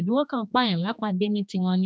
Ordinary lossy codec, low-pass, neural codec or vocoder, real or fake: none; none; codec, 16 kHz, 2 kbps, X-Codec, HuBERT features, trained on general audio; fake